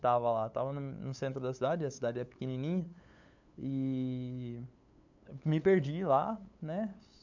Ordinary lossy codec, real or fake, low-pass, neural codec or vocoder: none; fake; 7.2 kHz; codec, 16 kHz, 8 kbps, FunCodec, trained on LibriTTS, 25 frames a second